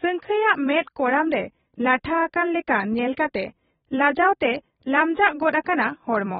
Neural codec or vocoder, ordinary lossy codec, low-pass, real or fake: vocoder, 44.1 kHz, 128 mel bands every 256 samples, BigVGAN v2; AAC, 16 kbps; 19.8 kHz; fake